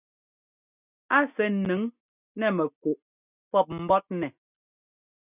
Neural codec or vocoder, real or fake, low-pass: none; real; 3.6 kHz